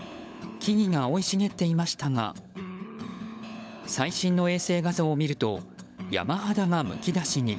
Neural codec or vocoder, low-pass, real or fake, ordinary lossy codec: codec, 16 kHz, 16 kbps, FunCodec, trained on LibriTTS, 50 frames a second; none; fake; none